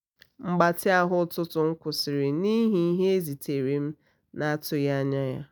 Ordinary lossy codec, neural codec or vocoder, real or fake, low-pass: none; none; real; none